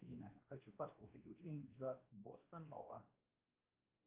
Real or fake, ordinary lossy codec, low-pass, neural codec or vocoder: fake; Opus, 32 kbps; 3.6 kHz; codec, 16 kHz, 1 kbps, X-Codec, WavLM features, trained on Multilingual LibriSpeech